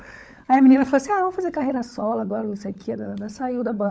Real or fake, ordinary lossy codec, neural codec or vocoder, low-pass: fake; none; codec, 16 kHz, 16 kbps, FunCodec, trained on LibriTTS, 50 frames a second; none